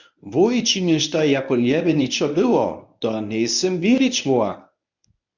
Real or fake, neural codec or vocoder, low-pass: fake; codec, 24 kHz, 0.9 kbps, WavTokenizer, medium speech release version 1; 7.2 kHz